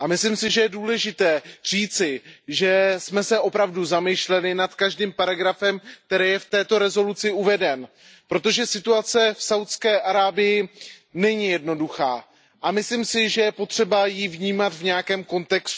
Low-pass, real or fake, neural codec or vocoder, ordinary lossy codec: none; real; none; none